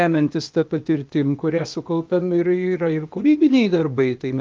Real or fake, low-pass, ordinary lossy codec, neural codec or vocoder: fake; 7.2 kHz; Opus, 24 kbps; codec, 16 kHz, 0.8 kbps, ZipCodec